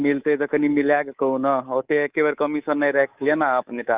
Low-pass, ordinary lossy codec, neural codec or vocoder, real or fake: 3.6 kHz; Opus, 32 kbps; codec, 24 kHz, 3.1 kbps, DualCodec; fake